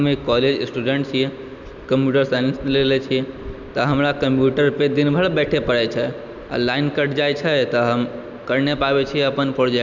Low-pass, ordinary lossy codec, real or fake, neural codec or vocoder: 7.2 kHz; none; real; none